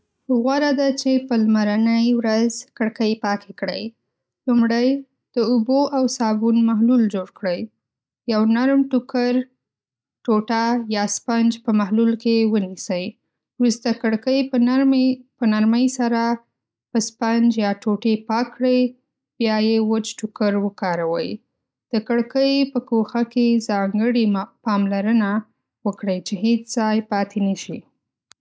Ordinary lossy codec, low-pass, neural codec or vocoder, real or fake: none; none; none; real